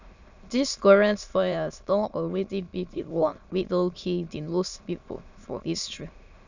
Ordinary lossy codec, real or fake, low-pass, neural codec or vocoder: none; fake; 7.2 kHz; autoencoder, 22.05 kHz, a latent of 192 numbers a frame, VITS, trained on many speakers